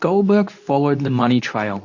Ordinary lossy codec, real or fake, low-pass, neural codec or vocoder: AAC, 48 kbps; fake; 7.2 kHz; codec, 24 kHz, 0.9 kbps, WavTokenizer, medium speech release version 2